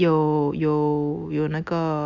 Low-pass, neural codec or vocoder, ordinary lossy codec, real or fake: 7.2 kHz; none; Opus, 64 kbps; real